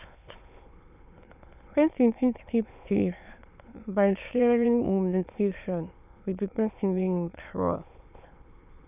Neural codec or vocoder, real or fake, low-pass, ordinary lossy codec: autoencoder, 22.05 kHz, a latent of 192 numbers a frame, VITS, trained on many speakers; fake; 3.6 kHz; none